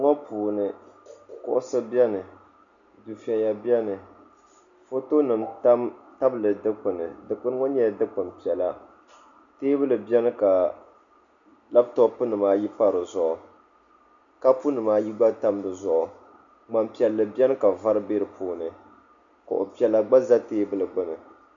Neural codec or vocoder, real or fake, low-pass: none; real; 7.2 kHz